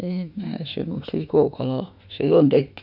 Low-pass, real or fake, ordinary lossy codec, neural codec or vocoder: 5.4 kHz; fake; none; autoencoder, 48 kHz, 32 numbers a frame, DAC-VAE, trained on Japanese speech